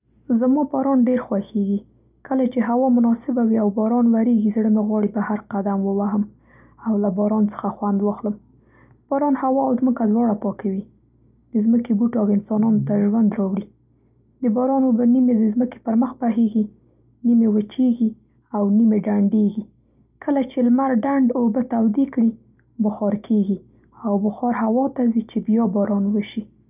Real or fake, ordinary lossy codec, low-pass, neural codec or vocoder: real; none; 3.6 kHz; none